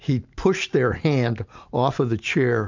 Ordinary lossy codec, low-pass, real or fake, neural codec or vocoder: MP3, 64 kbps; 7.2 kHz; real; none